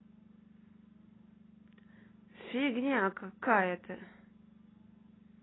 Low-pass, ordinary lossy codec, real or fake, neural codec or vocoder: 7.2 kHz; AAC, 16 kbps; real; none